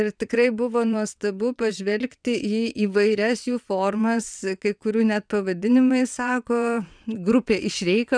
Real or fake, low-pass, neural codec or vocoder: fake; 9.9 kHz; vocoder, 22.05 kHz, 80 mel bands, WaveNeXt